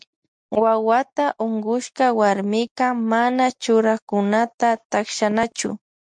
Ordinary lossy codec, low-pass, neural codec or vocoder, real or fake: MP3, 64 kbps; 9.9 kHz; none; real